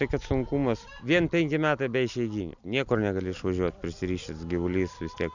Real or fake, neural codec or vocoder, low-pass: real; none; 7.2 kHz